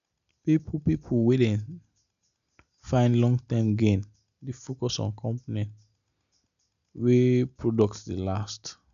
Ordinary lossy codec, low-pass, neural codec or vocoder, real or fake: none; 7.2 kHz; none; real